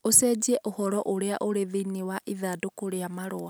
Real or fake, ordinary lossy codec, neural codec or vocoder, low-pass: real; none; none; none